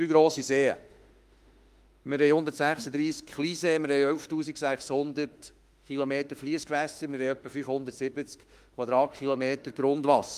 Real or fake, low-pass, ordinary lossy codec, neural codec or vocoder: fake; 14.4 kHz; Opus, 32 kbps; autoencoder, 48 kHz, 32 numbers a frame, DAC-VAE, trained on Japanese speech